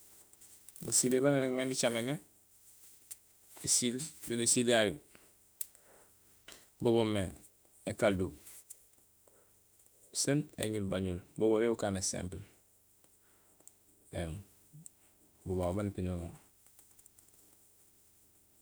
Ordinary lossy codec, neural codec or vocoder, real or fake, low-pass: none; autoencoder, 48 kHz, 32 numbers a frame, DAC-VAE, trained on Japanese speech; fake; none